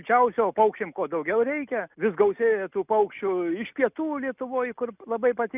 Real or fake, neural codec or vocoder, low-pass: real; none; 3.6 kHz